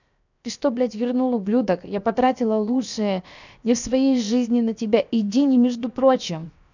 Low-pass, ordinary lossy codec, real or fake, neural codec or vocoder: 7.2 kHz; none; fake; codec, 16 kHz, 0.7 kbps, FocalCodec